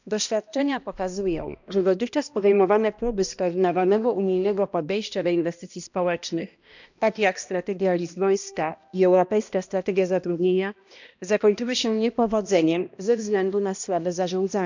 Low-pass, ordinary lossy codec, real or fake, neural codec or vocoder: 7.2 kHz; none; fake; codec, 16 kHz, 1 kbps, X-Codec, HuBERT features, trained on balanced general audio